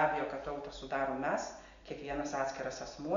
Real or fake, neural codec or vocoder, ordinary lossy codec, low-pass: real; none; Opus, 64 kbps; 7.2 kHz